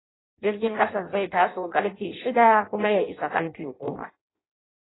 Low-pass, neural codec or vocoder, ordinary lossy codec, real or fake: 7.2 kHz; codec, 16 kHz in and 24 kHz out, 0.6 kbps, FireRedTTS-2 codec; AAC, 16 kbps; fake